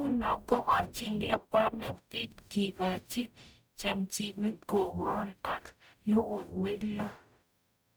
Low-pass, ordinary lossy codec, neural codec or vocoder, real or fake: none; none; codec, 44.1 kHz, 0.9 kbps, DAC; fake